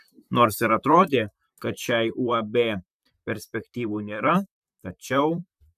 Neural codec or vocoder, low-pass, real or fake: vocoder, 44.1 kHz, 128 mel bands, Pupu-Vocoder; 14.4 kHz; fake